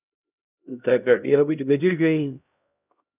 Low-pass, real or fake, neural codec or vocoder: 3.6 kHz; fake; codec, 16 kHz, 0.5 kbps, X-Codec, HuBERT features, trained on LibriSpeech